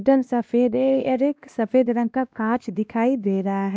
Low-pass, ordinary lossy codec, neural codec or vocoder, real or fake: none; none; codec, 16 kHz, 1 kbps, X-Codec, WavLM features, trained on Multilingual LibriSpeech; fake